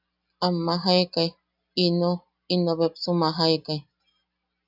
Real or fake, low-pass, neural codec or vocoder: real; 5.4 kHz; none